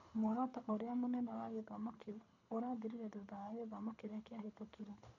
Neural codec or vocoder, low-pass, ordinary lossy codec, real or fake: codec, 24 kHz, 6 kbps, HILCodec; 7.2 kHz; none; fake